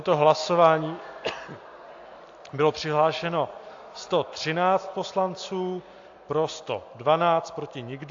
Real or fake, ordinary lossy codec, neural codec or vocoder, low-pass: real; AAC, 48 kbps; none; 7.2 kHz